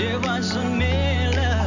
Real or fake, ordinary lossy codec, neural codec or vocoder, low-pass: real; none; none; 7.2 kHz